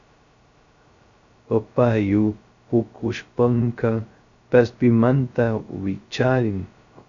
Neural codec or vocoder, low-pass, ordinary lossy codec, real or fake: codec, 16 kHz, 0.2 kbps, FocalCodec; 7.2 kHz; Opus, 64 kbps; fake